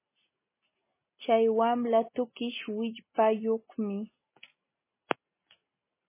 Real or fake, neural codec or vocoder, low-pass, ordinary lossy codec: real; none; 3.6 kHz; MP3, 16 kbps